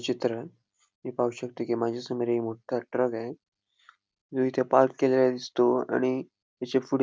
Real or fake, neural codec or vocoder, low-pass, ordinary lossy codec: real; none; none; none